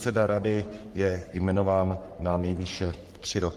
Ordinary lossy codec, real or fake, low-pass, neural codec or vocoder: Opus, 32 kbps; fake; 14.4 kHz; codec, 44.1 kHz, 3.4 kbps, Pupu-Codec